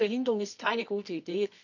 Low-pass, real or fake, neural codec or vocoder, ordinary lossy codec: 7.2 kHz; fake; codec, 24 kHz, 0.9 kbps, WavTokenizer, medium music audio release; none